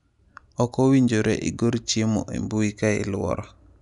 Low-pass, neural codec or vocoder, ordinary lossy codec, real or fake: 10.8 kHz; none; none; real